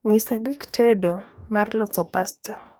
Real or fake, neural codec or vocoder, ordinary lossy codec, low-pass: fake; codec, 44.1 kHz, 2.6 kbps, DAC; none; none